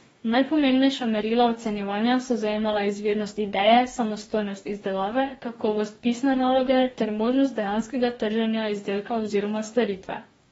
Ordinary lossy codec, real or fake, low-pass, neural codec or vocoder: AAC, 24 kbps; fake; 19.8 kHz; codec, 44.1 kHz, 2.6 kbps, DAC